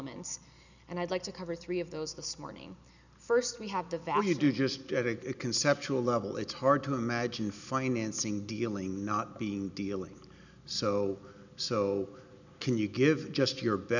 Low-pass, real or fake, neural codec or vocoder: 7.2 kHz; real; none